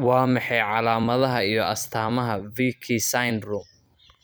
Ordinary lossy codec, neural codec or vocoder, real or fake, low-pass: none; vocoder, 44.1 kHz, 128 mel bands every 256 samples, BigVGAN v2; fake; none